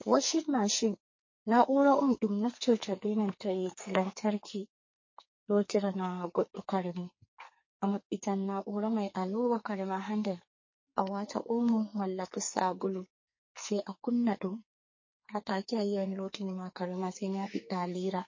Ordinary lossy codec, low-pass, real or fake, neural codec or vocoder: MP3, 32 kbps; 7.2 kHz; fake; codec, 44.1 kHz, 2.6 kbps, SNAC